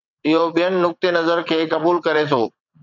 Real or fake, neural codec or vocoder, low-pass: fake; vocoder, 22.05 kHz, 80 mel bands, WaveNeXt; 7.2 kHz